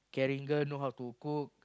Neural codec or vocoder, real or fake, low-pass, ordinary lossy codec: none; real; none; none